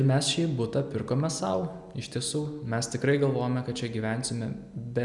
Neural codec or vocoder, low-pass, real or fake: none; 10.8 kHz; real